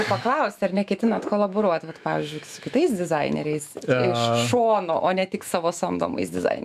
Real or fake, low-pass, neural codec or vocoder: fake; 14.4 kHz; autoencoder, 48 kHz, 128 numbers a frame, DAC-VAE, trained on Japanese speech